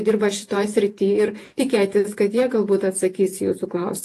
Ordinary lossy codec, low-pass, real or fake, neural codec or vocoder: AAC, 48 kbps; 14.4 kHz; fake; vocoder, 48 kHz, 128 mel bands, Vocos